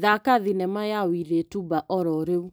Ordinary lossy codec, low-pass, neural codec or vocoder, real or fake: none; none; none; real